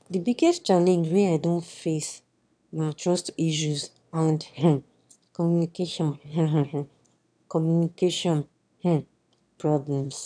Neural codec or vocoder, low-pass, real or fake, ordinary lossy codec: autoencoder, 22.05 kHz, a latent of 192 numbers a frame, VITS, trained on one speaker; 9.9 kHz; fake; none